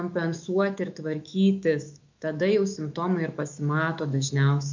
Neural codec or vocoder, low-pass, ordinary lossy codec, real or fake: autoencoder, 48 kHz, 128 numbers a frame, DAC-VAE, trained on Japanese speech; 7.2 kHz; MP3, 64 kbps; fake